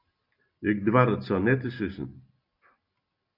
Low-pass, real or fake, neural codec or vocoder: 5.4 kHz; real; none